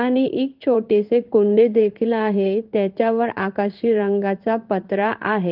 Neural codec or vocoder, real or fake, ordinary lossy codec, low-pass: codec, 16 kHz in and 24 kHz out, 1 kbps, XY-Tokenizer; fake; Opus, 32 kbps; 5.4 kHz